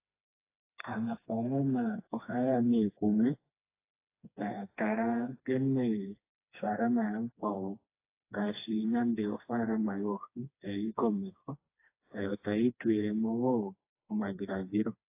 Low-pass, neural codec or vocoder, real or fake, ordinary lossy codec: 3.6 kHz; codec, 16 kHz, 2 kbps, FreqCodec, smaller model; fake; AAC, 24 kbps